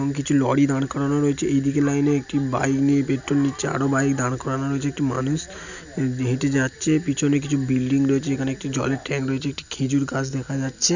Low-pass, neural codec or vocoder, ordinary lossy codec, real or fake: 7.2 kHz; none; none; real